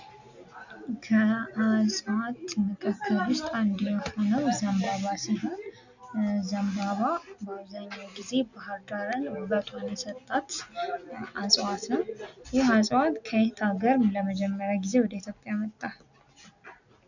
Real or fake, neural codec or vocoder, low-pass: real; none; 7.2 kHz